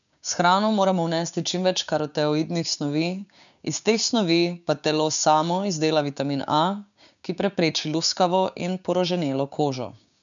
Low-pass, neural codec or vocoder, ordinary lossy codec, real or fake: 7.2 kHz; codec, 16 kHz, 6 kbps, DAC; none; fake